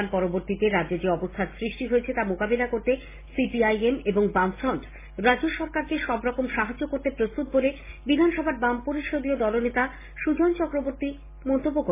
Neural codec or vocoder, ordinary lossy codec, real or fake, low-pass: none; MP3, 16 kbps; real; 3.6 kHz